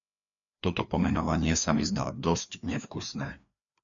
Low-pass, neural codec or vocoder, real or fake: 7.2 kHz; codec, 16 kHz, 2 kbps, FreqCodec, larger model; fake